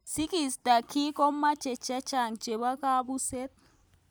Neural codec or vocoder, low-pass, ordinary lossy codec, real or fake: none; none; none; real